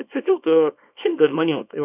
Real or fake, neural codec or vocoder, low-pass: fake; codec, 24 kHz, 0.9 kbps, WavTokenizer, small release; 3.6 kHz